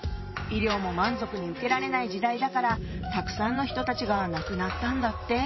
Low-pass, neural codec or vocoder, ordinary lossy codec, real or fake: 7.2 kHz; vocoder, 44.1 kHz, 128 mel bands every 512 samples, BigVGAN v2; MP3, 24 kbps; fake